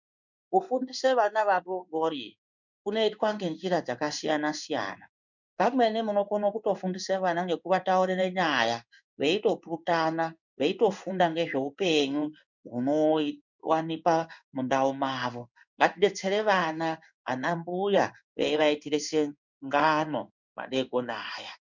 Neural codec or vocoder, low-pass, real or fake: codec, 16 kHz in and 24 kHz out, 1 kbps, XY-Tokenizer; 7.2 kHz; fake